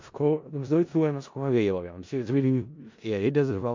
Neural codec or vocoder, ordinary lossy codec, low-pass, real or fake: codec, 16 kHz in and 24 kHz out, 0.4 kbps, LongCat-Audio-Codec, four codebook decoder; MP3, 48 kbps; 7.2 kHz; fake